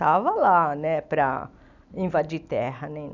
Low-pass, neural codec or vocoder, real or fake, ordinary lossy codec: 7.2 kHz; none; real; none